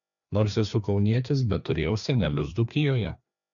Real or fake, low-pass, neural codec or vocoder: fake; 7.2 kHz; codec, 16 kHz, 2 kbps, FreqCodec, larger model